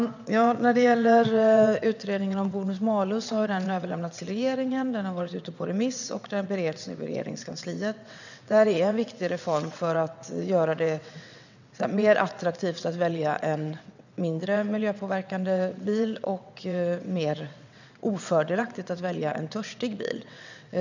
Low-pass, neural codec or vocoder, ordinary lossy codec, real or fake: 7.2 kHz; vocoder, 22.05 kHz, 80 mel bands, WaveNeXt; none; fake